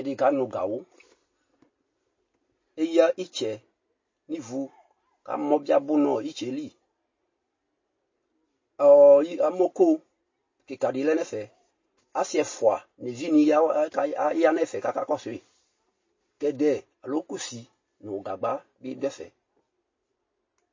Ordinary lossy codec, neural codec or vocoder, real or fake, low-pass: MP3, 32 kbps; none; real; 7.2 kHz